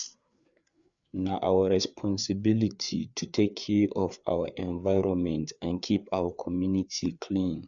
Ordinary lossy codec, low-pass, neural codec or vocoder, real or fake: none; 7.2 kHz; codec, 16 kHz, 4 kbps, FreqCodec, larger model; fake